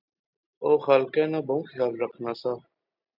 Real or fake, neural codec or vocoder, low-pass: real; none; 5.4 kHz